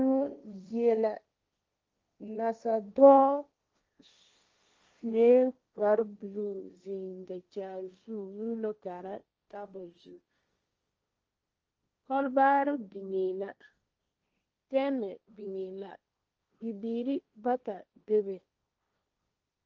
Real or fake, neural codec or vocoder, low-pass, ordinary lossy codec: fake; codec, 16 kHz, 1.1 kbps, Voila-Tokenizer; 7.2 kHz; Opus, 24 kbps